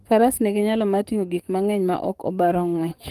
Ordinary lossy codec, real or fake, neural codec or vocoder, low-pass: Opus, 24 kbps; fake; codec, 44.1 kHz, 7.8 kbps, DAC; 14.4 kHz